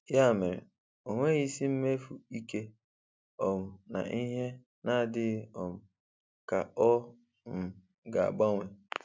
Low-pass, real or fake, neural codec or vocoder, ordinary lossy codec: none; real; none; none